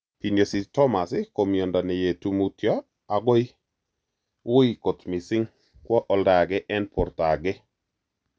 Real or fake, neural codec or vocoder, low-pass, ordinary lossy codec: real; none; none; none